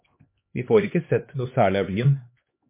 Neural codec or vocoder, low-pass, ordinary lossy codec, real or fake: codec, 16 kHz, 2 kbps, X-Codec, HuBERT features, trained on LibriSpeech; 3.6 kHz; MP3, 24 kbps; fake